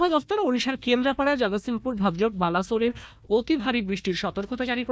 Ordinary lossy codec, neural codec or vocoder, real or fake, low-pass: none; codec, 16 kHz, 1 kbps, FunCodec, trained on Chinese and English, 50 frames a second; fake; none